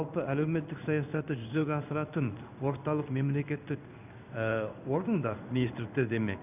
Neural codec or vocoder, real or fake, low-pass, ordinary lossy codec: codec, 16 kHz in and 24 kHz out, 1 kbps, XY-Tokenizer; fake; 3.6 kHz; none